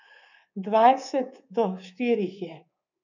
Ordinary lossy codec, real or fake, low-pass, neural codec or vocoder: none; fake; 7.2 kHz; codec, 24 kHz, 3.1 kbps, DualCodec